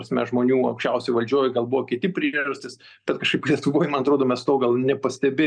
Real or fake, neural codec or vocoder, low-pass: real; none; 9.9 kHz